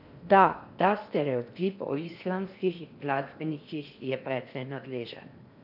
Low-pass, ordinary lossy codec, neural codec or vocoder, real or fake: 5.4 kHz; none; codec, 16 kHz in and 24 kHz out, 0.8 kbps, FocalCodec, streaming, 65536 codes; fake